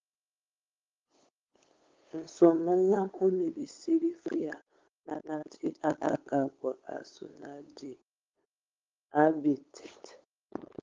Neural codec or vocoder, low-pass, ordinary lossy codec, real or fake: codec, 16 kHz, 8 kbps, FunCodec, trained on LibriTTS, 25 frames a second; 7.2 kHz; Opus, 32 kbps; fake